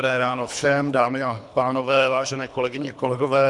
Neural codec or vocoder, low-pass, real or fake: codec, 24 kHz, 3 kbps, HILCodec; 10.8 kHz; fake